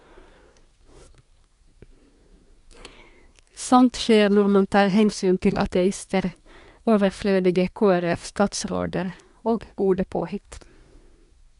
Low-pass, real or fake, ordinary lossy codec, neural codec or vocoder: 10.8 kHz; fake; none; codec, 24 kHz, 1 kbps, SNAC